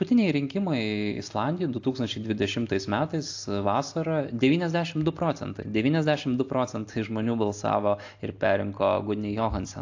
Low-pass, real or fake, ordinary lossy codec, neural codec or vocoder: 7.2 kHz; real; AAC, 48 kbps; none